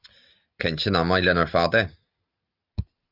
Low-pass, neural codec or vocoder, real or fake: 5.4 kHz; none; real